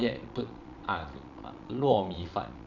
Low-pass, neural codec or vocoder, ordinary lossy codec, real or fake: 7.2 kHz; vocoder, 22.05 kHz, 80 mel bands, Vocos; none; fake